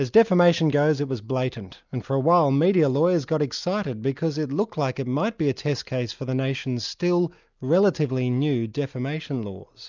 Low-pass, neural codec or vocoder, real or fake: 7.2 kHz; none; real